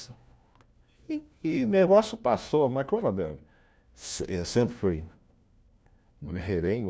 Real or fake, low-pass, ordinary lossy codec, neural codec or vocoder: fake; none; none; codec, 16 kHz, 1 kbps, FunCodec, trained on LibriTTS, 50 frames a second